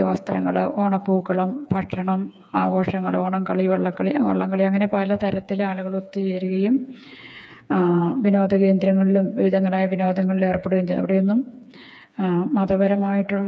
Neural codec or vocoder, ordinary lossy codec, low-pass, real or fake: codec, 16 kHz, 4 kbps, FreqCodec, smaller model; none; none; fake